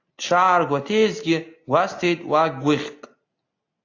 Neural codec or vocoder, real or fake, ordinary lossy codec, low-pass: none; real; AAC, 48 kbps; 7.2 kHz